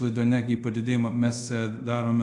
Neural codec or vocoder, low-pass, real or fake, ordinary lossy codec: codec, 24 kHz, 0.5 kbps, DualCodec; 10.8 kHz; fake; AAC, 64 kbps